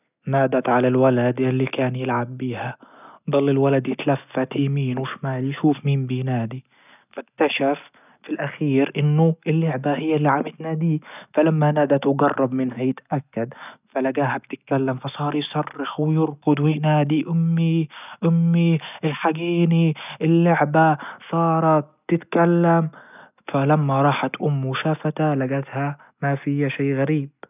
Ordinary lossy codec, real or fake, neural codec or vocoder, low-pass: none; real; none; 3.6 kHz